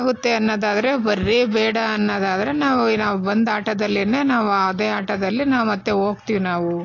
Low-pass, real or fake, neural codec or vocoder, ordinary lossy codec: 7.2 kHz; real; none; AAC, 32 kbps